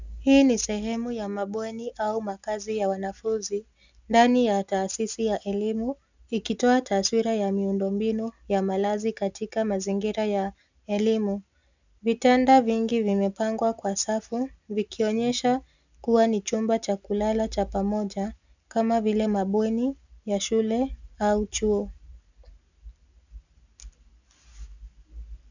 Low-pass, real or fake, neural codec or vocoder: 7.2 kHz; real; none